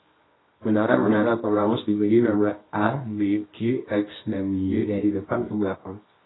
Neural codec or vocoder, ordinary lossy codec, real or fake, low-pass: codec, 24 kHz, 0.9 kbps, WavTokenizer, medium music audio release; AAC, 16 kbps; fake; 7.2 kHz